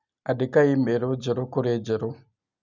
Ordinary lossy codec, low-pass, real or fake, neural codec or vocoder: none; 7.2 kHz; real; none